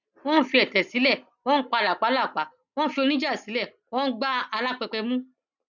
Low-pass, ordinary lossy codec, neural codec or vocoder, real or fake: 7.2 kHz; none; none; real